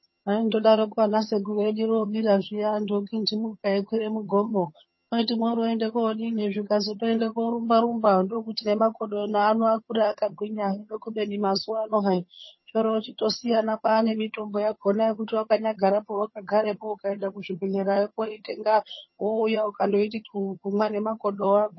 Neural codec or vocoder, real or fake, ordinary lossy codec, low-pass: vocoder, 22.05 kHz, 80 mel bands, HiFi-GAN; fake; MP3, 24 kbps; 7.2 kHz